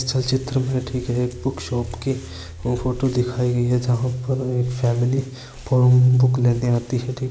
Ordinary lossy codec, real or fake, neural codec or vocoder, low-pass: none; real; none; none